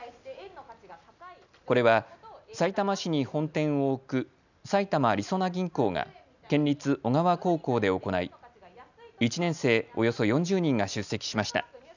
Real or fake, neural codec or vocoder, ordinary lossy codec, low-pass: real; none; none; 7.2 kHz